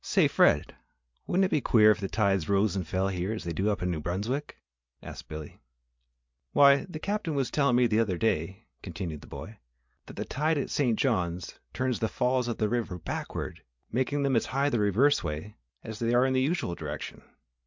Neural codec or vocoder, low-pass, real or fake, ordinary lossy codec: none; 7.2 kHz; real; MP3, 64 kbps